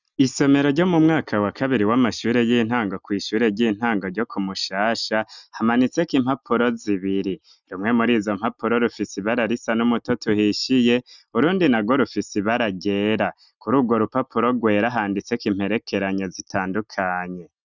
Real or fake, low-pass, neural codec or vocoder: real; 7.2 kHz; none